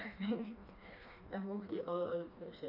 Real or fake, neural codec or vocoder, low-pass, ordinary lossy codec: fake; codec, 16 kHz, 2 kbps, FreqCodec, smaller model; 5.4 kHz; AAC, 32 kbps